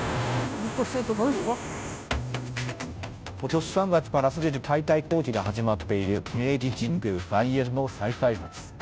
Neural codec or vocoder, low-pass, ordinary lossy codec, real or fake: codec, 16 kHz, 0.5 kbps, FunCodec, trained on Chinese and English, 25 frames a second; none; none; fake